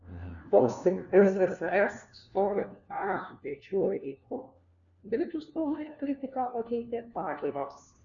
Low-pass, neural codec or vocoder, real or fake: 7.2 kHz; codec, 16 kHz, 1 kbps, FunCodec, trained on LibriTTS, 50 frames a second; fake